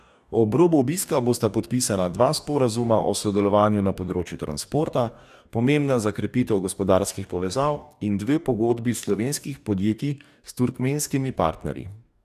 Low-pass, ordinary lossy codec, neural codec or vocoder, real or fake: 14.4 kHz; none; codec, 44.1 kHz, 2.6 kbps, DAC; fake